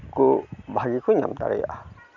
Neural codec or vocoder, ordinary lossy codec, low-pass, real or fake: none; none; 7.2 kHz; real